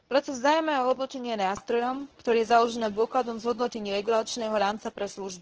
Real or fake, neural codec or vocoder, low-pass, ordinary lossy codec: fake; codec, 24 kHz, 0.9 kbps, WavTokenizer, medium speech release version 1; 7.2 kHz; Opus, 16 kbps